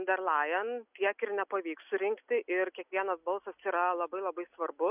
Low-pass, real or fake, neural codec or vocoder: 3.6 kHz; real; none